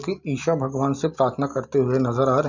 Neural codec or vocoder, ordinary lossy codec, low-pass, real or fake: none; none; 7.2 kHz; real